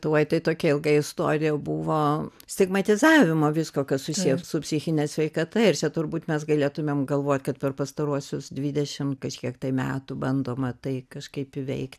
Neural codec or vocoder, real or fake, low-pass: none; real; 14.4 kHz